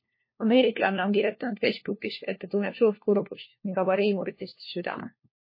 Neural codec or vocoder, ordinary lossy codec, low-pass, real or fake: codec, 16 kHz, 1 kbps, FunCodec, trained on LibriTTS, 50 frames a second; MP3, 24 kbps; 5.4 kHz; fake